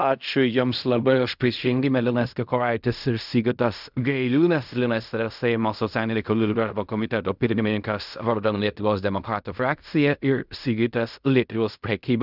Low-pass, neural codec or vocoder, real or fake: 5.4 kHz; codec, 16 kHz in and 24 kHz out, 0.4 kbps, LongCat-Audio-Codec, fine tuned four codebook decoder; fake